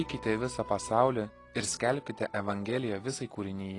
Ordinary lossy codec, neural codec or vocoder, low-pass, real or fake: AAC, 32 kbps; none; 10.8 kHz; real